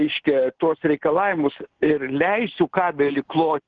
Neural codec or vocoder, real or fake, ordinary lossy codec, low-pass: none; real; Opus, 16 kbps; 7.2 kHz